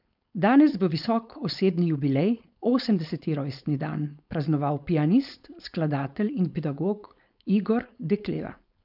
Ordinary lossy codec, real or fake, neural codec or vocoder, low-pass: none; fake; codec, 16 kHz, 4.8 kbps, FACodec; 5.4 kHz